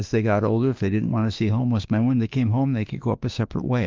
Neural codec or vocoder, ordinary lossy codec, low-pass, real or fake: autoencoder, 48 kHz, 32 numbers a frame, DAC-VAE, trained on Japanese speech; Opus, 24 kbps; 7.2 kHz; fake